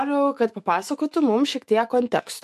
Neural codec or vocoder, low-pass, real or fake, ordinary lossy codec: autoencoder, 48 kHz, 128 numbers a frame, DAC-VAE, trained on Japanese speech; 14.4 kHz; fake; MP3, 64 kbps